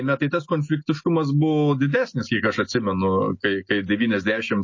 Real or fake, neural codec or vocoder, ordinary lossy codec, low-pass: real; none; MP3, 32 kbps; 7.2 kHz